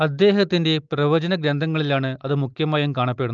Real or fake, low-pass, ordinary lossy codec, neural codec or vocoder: real; 7.2 kHz; Opus, 24 kbps; none